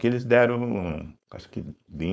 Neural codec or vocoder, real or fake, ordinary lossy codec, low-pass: codec, 16 kHz, 4.8 kbps, FACodec; fake; none; none